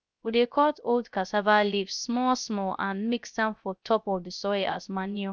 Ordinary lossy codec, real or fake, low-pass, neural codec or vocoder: none; fake; none; codec, 16 kHz, 0.3 kbps, FocalCodec